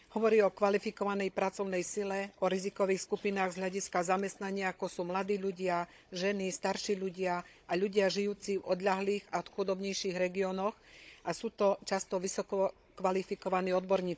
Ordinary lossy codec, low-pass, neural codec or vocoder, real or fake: none; none; codec, 16 kHz, 16 kbps, FunCodec, trained on Chinese and English, 50 frames a second; fake